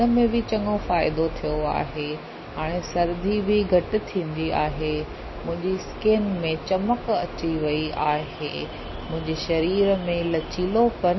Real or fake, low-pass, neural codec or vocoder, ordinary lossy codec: real; 7.2 kHz; none; MP3, 24 kbps